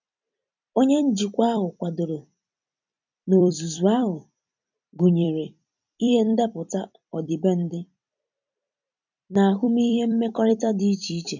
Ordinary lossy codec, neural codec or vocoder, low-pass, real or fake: none; vocoder, 44.1 kHz, 128 mel bands every 256 samples, BigVGAN v2; 7.2 kHz; fake